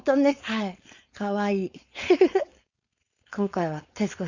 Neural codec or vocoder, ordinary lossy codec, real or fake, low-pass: codec, 16 kHz, 4.8 kbps, FACodec; Opus, 64 kbps; fake; 7.2 kHz